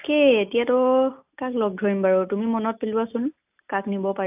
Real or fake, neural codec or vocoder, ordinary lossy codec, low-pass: real; none; AAC, 32 kbps; 3.6 kHz